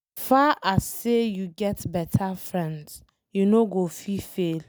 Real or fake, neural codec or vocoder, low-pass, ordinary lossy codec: real; none; none; none